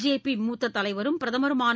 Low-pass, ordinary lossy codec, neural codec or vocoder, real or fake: none; none; none; real